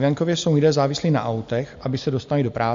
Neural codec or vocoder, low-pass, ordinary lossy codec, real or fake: none; 7.2 kHz; MP3, 48 kbps; real